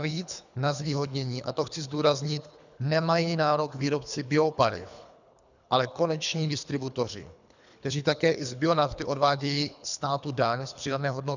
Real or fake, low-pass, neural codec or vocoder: fake; 7.2 kHz; codec, 24 kHz, 3 kbps, HILCodec